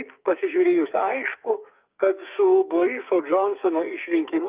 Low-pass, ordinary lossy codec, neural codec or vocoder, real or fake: 3.6 kHz; Opus, 24 kbps; codec, 44.1 kHz, 2.6 kbps, SNAC; fake